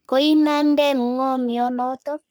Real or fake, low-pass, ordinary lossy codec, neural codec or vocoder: fake; none; none; codec, 44.1 kHz, 3.4 kbps, Pupu-Codec